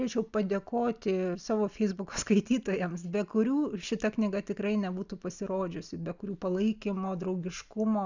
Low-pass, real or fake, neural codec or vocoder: 7.2 kHz; real; none